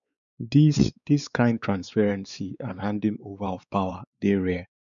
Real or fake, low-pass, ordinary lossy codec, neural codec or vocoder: fake; 7.2 kHz; none; codec, 16 kHz, 4 kbps, X-Codec, WavLM features, trained on Multilingual LibriSpeech